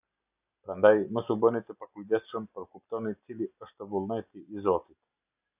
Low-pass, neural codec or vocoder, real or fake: 3.6 kHz; none; real